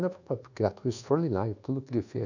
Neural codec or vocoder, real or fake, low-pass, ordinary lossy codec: codec, 24 kHz, 1.2 kbps, DualCodec; fake; 7.2 kHz; none